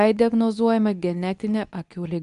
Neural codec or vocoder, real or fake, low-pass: codec, 24 kHz, 0.9 kbps, WavTokenizer, medium speech release version 2; fake; 10.8 kHz